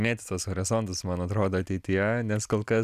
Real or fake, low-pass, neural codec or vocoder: real; 14.4 kHz; none